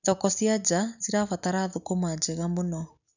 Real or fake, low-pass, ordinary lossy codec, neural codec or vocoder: real; 7.2 kHz; none; none